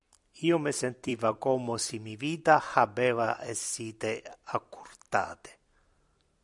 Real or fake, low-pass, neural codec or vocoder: fake; 10.8 kHz; vocoder, 44.1 kHz, 128 mel bands every 256 samples, BigVGAN v2